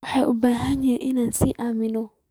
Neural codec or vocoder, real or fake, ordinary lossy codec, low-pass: codec, 44.1 kHz, 7.8 kbps, DAC; fake; none; none